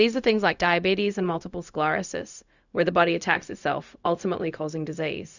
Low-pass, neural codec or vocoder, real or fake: 7.2 kHz; codec, 16 kHz, 0.4 kbps, LongCat-Audio-Codec; fake